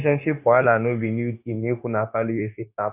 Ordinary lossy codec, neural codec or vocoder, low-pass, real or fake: none; codec, 16 kHz, 0.9 kbps, LongCat-Audio-Codec; 3.6 kHz; fake